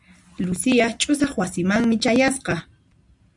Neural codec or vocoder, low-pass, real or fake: none; 10.8 kHz; real